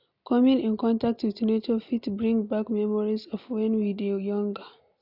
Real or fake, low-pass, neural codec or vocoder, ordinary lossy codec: real; 5.4 kHz; none; none